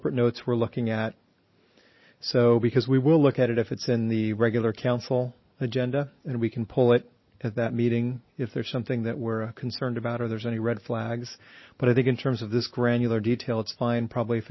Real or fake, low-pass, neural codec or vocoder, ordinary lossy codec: real; 7.2 kHz; none; MP3, 24 kbps